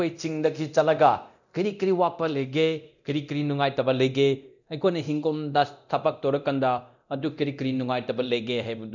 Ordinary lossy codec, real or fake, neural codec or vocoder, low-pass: none; fake; codec, 24 kHz, 0.9 kbps, DualCodec; 7.2 kHz